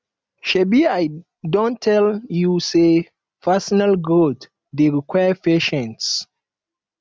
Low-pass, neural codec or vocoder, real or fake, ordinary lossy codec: none; none; real; none